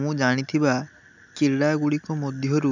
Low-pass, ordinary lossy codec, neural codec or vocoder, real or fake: 7.2 kHz; none; none; real